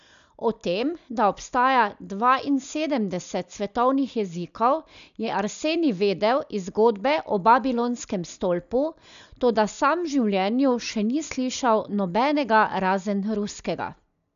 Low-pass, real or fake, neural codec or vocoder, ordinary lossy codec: 7.2 kHz; real; none; none